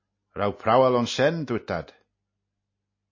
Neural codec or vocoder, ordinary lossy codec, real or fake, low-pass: none; MP3, 32 kbps; real; 7.2 kHz